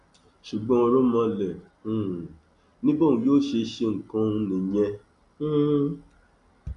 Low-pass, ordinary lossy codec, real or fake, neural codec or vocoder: 10.8 kHz; none; real; none